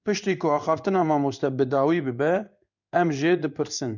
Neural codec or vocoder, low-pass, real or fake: codec, 16 kHz in and 24 kHz out, 1 kbps, XY-Tokenizer; 7.2 kHz; fake